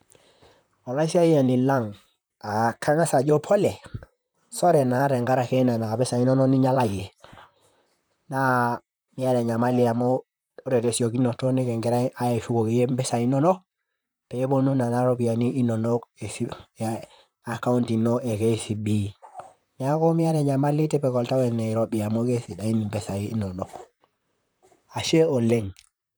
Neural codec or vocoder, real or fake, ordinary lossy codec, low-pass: vocoder, 44.1 kHz, 128 mel bands, Pupu-Vocoder; fake; none; none